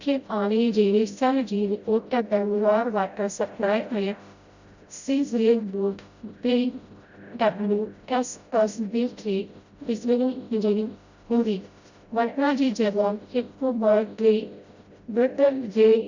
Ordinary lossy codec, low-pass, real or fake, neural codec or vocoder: Opus, 64 kbps; 7.2 kHz; fake; codec, 16 kHz, 0.5 kbps, FreqCodec, smaller model